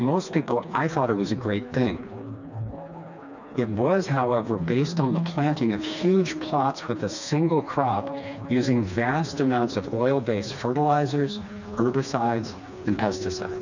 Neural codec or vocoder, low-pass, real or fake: codec, 16 kHz, 2 kbps, FreqCodec, smaller model; 7.2 kHz; fake